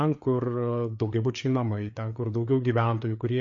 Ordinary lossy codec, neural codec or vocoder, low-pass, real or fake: MP3, 48 kbps; codec, 16 kHz, 4 kbps, FreqCodec, larger model; 7.2 kHz; fake